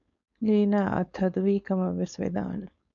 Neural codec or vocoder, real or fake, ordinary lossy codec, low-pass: codec, 16 kHz, 4.8 kbps, FACodec; fake; MP3, 96 kbps; 7.2 kHz